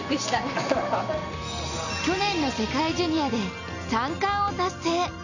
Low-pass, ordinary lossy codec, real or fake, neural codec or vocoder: 7.2 kHz; none; real; none